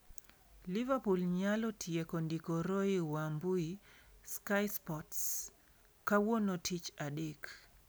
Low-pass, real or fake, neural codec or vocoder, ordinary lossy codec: none; real; none; none